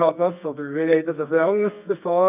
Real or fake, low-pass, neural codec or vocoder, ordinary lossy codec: fake; 3.6 kHz; codec, 24 kHz, 0.9 kbps, WavTokenizer, medium music audio release; none